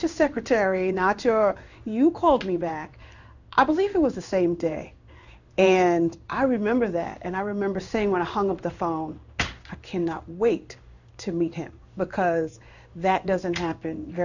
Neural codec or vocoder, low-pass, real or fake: codec, 16 kHz in and 24 kHz out, 1 kbps, XY-Tokenizer; 7.2 kHz; fake